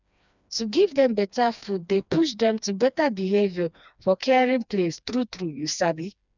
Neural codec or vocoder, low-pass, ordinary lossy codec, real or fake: codec, 16 kHz, 2 kbps, FreqCodec, smaller model; 7.2 kHz; none; fake